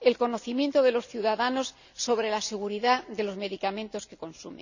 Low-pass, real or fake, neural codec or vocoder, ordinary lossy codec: 7.2 kHz; real; none; none